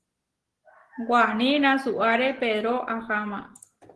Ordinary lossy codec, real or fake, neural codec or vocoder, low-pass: Opus, 16 kbps; real; none; 10.8 kHz